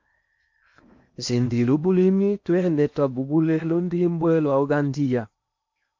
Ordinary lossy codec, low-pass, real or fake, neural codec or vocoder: MP3, 48 kbps; 7.2 kHz; fake; codec, 16 kHz in and 24 kHz out, 0.8 kbps, FocalCodec, streaming, 65536 codes